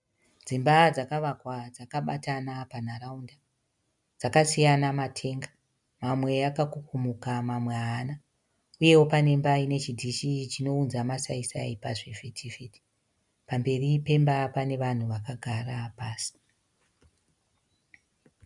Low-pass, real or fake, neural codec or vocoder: 10.8 kHz; real; none